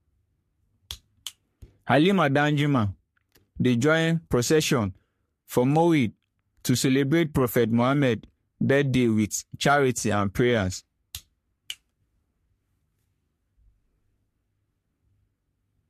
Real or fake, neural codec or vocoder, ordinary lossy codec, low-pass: fake; codec, 44.1 kHz, 3.4 kbps, Pupu-Codec; MP3, 64 kbps; 14.4 kHz